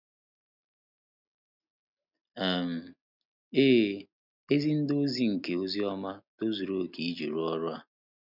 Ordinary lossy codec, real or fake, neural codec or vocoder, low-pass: none; real; none; 5.4 kHz